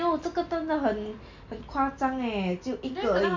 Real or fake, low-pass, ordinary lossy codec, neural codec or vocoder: real; 7.2 kHz; MP3, 64 kbps; none